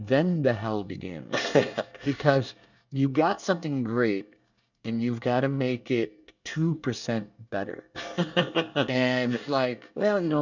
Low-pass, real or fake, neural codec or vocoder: 7.2 kHz; fake; codec, 24 kHz, 1 kbps, SNAC